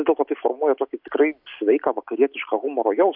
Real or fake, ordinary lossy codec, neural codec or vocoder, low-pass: real; MP3, 48 kbps; none; 5.4 kHz